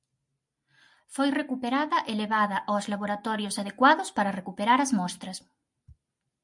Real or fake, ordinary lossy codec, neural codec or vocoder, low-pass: real; MP3, 64 kbps; none; 10.8 kHz